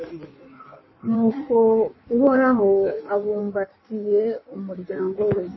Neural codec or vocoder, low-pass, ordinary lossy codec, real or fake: codec, 16 kHz in and 24 kHz out, 1.1 kbps, FireRedTTS-2 codec; 7.2 kHz; MP3, 24 kbps; fake